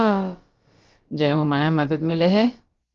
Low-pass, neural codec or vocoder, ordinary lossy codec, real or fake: 7.2 kHz; codec, 16 kHz, about 1 kbps, DyCAST, with the encoder's durations; Opus, 32 kbps; fake